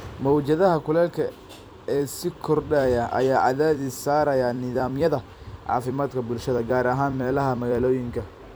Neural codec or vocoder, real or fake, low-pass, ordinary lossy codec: vocoder, 44.1 kHz, 128 mel bands every 256 samples, BigVGAN v2; fake; none; none